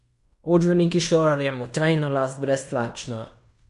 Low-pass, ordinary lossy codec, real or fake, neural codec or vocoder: 10.8 kHz; none; fake; codec, 16 kHz in and 24 kHz out, 0.9 kbps, LongCat-Audio-Codec, fine tuned four codebook decoder